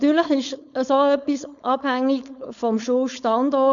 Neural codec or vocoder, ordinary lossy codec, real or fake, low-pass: codec, 16 kHz, 4.8 kbps, FACodec; none; fake; 7.2 kHz